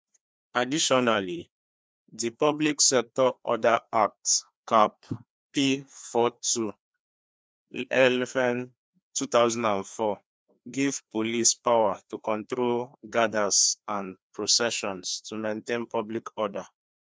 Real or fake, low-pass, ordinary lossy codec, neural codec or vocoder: fake; none; none; codec, 16 kHz, 2 kbps, FreqCodec, larger model